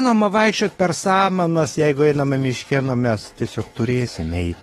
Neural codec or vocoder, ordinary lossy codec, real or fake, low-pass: autoencoder, 48 kHz, 32 numbers a frame, DAC-VAE, trained on Japanese speech; AAC, 32 kbps; fake; 19.8 kHz